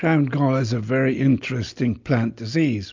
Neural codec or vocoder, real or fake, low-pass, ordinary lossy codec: none; real; 7.2 kHz; MP3, 64 kbps